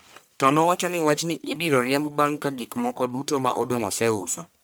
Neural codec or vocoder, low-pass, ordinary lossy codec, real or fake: codec, 44.1 kHz, 1.7 kbps, Pupu-Codec; none; none; fake